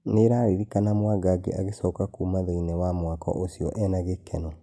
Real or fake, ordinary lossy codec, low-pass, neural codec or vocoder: real; none; none; none